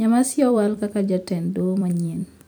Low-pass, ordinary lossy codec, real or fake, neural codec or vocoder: none; none; fake; vocoder, 44.1 kHz, 128 mel bands every 256 samples, BigVGAN v2